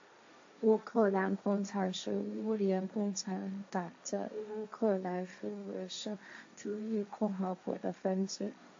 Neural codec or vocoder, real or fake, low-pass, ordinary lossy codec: codec, 16 kHz, 1.1 kbps, Voila-Tokenizer; fake; 7.2 kHz; AAC, 48 kbps